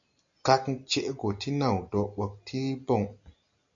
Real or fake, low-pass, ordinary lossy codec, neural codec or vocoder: real; 7.2 kHz; MP3, 64 kbps; none